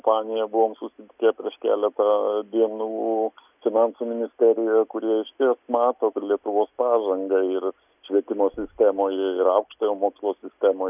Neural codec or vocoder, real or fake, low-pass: none; real; 3.6 kHz